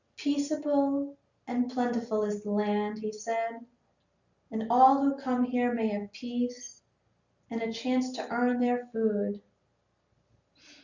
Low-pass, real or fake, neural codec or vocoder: 7.2 kHz; real; none